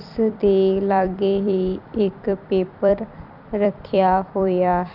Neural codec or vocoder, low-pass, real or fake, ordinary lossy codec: vocoder, 44.1 kHz, 128 mel bands every 256 samples, BigVGAN v2; 5.4 kHz; fake; none